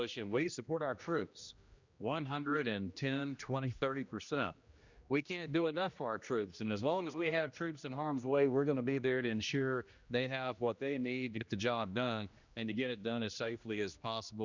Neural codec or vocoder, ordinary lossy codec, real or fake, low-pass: codec, 16 kHz, 1 kbps, X-Codec, HuBERT features, trained on general audio; Opus, 64 kbps; fake; 7.2 kHz